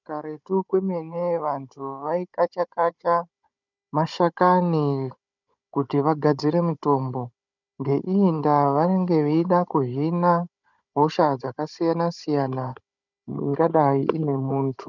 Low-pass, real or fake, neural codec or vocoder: 7.2 kHz; fake; codec, 16 kHz, 16 kbps, FunCodec, trained on Chinese and English, 50 frames a second